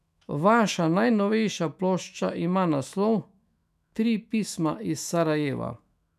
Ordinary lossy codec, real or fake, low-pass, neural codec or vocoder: none; fake; 14.4 kHz; autoencoder, 48 kHz, 128 numbers a frame, DAC-VAE, trained on Japanese speech